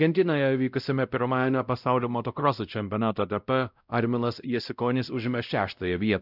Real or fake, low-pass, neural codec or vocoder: fake; 5.4 kHz; codec, 16 kHz, 0.5 kbps, X-Codec, WavLM features, trained on Multilingual LibriSpeech